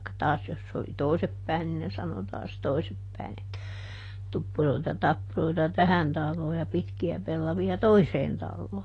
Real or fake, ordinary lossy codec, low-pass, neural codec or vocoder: real; AAC, 32 kbps; 10.8 kHz; none